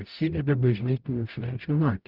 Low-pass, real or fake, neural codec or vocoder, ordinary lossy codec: 5.4 kHz; fake; codec, 44.1 kHz, 0.9 kbps, DAC; Opus, 32 kbps